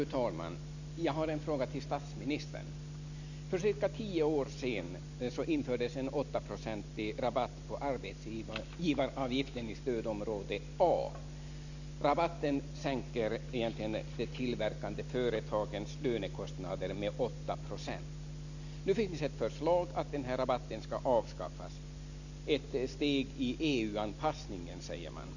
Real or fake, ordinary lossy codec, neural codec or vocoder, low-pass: real; none; none; 7.2 kHz